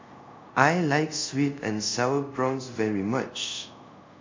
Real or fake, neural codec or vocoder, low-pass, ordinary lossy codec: fake; codec, 24 kHz, 0.5 kbps, DualCodec; 7.2 kHz; MP3, 48 kbps